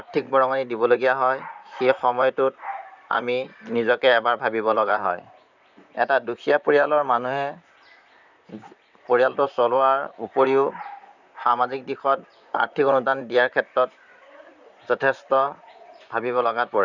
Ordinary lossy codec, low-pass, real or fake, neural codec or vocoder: none; 7.2 kHz; fake; vocoder, 44.1 kHz, 128 mel bands, Pupu-Vocoder